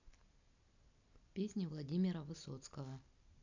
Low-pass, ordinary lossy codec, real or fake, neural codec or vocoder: 7.2 kHz; none; real; none